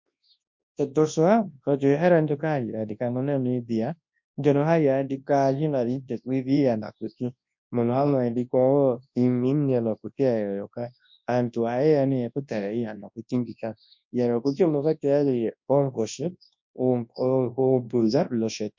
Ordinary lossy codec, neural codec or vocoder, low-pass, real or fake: MP3, 48 kbps; codec, 24 kHz, 0.9 kbps, WavTokenizer, large speech release; 7.2 kHz; fake